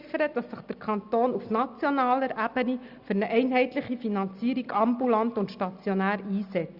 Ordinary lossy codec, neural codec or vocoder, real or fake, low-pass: Opus, 64 kbps; none; real; 5.4 kHz